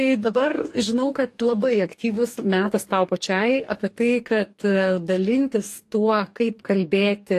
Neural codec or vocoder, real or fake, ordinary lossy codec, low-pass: codec, 44.1 kHz, 2.6 kbps, DAC; fake; AAC, 64 kbps; 14.4 kHz